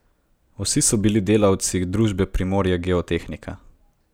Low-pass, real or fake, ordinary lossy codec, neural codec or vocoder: none; real; none; none